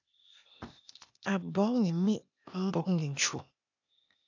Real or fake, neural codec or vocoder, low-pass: fake; codec, 16 kHz, 0.8 kbps, ZipCodec; 7.2 kHz